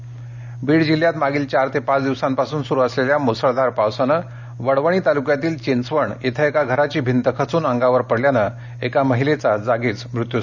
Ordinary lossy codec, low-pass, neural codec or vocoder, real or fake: none; 7.2 kHz; none; real